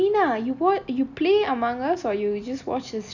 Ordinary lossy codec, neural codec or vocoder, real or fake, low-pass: none; none; real; 7.2 kHz